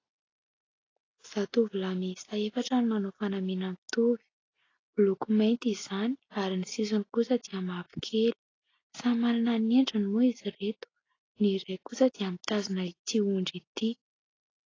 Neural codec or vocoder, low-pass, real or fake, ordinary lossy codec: none; 7.2 kHz; real; AAC, 32 kbps